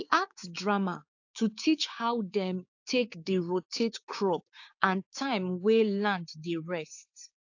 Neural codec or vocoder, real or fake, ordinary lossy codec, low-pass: codec, 16 kHz, 6 kbps, DAC; fake; AAC, 48 kbps; 7.2 kHz